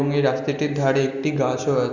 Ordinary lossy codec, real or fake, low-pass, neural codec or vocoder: none; real; 7.2 kHz; none